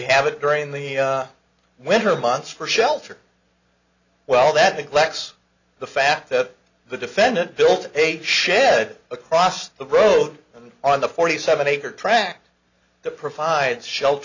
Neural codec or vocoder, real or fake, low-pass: none; real; 7.2 kHz